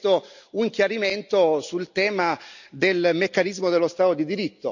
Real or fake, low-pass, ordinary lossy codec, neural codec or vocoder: real; 7.2 kHz; none; none